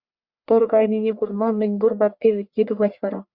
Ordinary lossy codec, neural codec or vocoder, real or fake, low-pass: Opus, 64 kbps; codec, 44.1 kHz, 1.7 kbps, Pupu-Codec; fake; 5.4 kHz